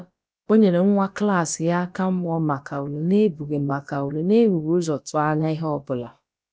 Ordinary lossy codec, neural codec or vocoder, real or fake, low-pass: none; codec, 16 kHz, about 1 kbps, DyCAST, with the encoder's durations; fake; none